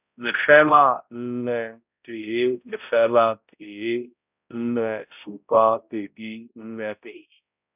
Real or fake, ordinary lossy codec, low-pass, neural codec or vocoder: fake; none; 3.6 kHz; codec, 16 kHz, 0.5 kbps, X-Codec, HuBERT features, trained on balanced general audio